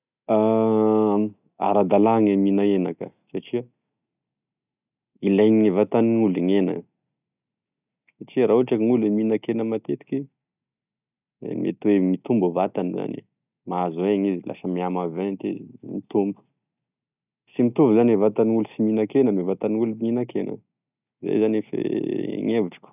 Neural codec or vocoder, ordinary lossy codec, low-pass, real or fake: none; none; 3.6 kHz; real